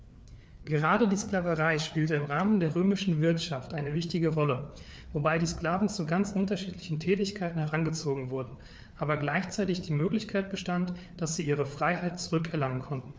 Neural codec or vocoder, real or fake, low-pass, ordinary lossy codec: codec, 16 kHz, 4 kbps, FreqCodec, larger model; fake; none; none